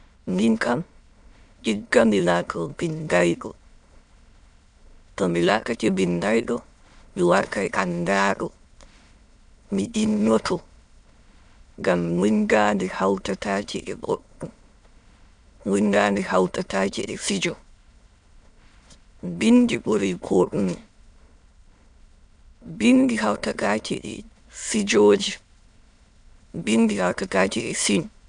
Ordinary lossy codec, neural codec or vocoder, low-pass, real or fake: none; autoencoder, 22.05 kHz, a latent of 192 numbers a frame, VITS, trained on many speakers; 9.9 kHz; fake